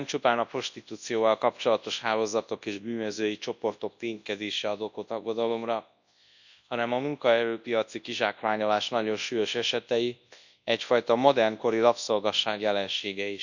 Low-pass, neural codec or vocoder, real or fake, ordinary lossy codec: 7.2 kHz; codec, 24 kHz, 0.9 kbps, WavTokenizer, large speech release; fake; none